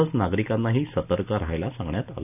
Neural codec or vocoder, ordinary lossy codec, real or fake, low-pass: none; none; real; 3.6 kHz